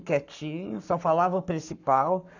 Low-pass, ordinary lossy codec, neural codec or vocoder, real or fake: 7.2 kHz; none; codec, 44.1 kHz, 7.8 kbps, Pupu-Codec; fake